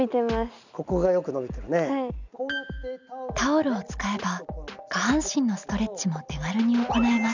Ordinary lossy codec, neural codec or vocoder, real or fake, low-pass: none; none; real; 7.2 kHz